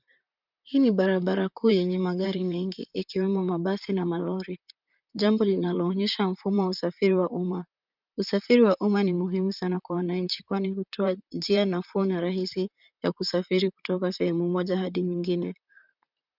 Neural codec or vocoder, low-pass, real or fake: vocoder, 44.1 kHz, 128 mel bands, Pupu-Vocoder; 5.4 kHz; fake